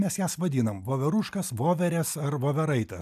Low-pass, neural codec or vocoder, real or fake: 14.4 kHz; none; real